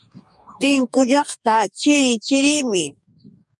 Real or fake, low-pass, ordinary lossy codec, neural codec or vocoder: fake; 10.8 kHz; MP3, 96 kbps; codec, 44.1 kHz, 2.6 kbps, DAC